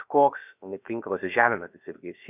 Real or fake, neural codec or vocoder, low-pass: fake; codec, 16 kHz, about 1 kbps, DyCAST, with the encoder's durations; 3.6 kHz